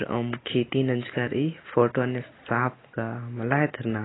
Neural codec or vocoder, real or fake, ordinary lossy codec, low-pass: none; real; AAC, 16 kbps; 7.2 kHz